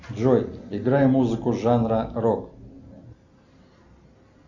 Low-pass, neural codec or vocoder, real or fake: 7.2 kHz; none; real